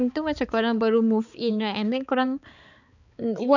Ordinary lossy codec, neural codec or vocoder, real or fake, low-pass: none; codec, 16 kHz, 4 kbps, X-Codec, HuBERT features, trained on balanced general audio; fake; 7.2 kHz